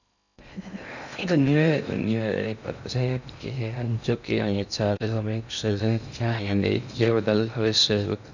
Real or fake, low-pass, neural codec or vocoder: fake; 7.2 kHz; codec, 16 kHz in and 24 kHz out, 0.6 kbps, FocalCodec, streaming, 2048 codes